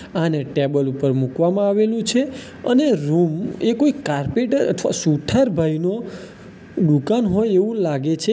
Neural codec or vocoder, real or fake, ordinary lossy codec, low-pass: none; real; none; none